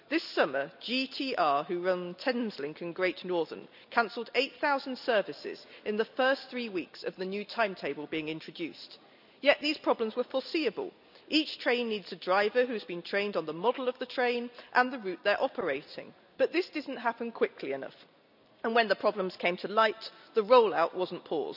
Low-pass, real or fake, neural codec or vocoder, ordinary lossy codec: 5.4 kHz; real; none; none